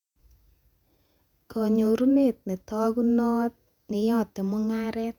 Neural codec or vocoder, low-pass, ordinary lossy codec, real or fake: vocoder, 48 kHz, 128 mel bands, Vocos; 19.8 kHz; none; fake